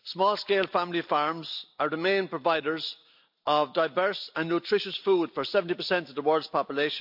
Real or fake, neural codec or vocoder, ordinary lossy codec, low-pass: real; none; AAC, 48 kbps; 5.4 kHz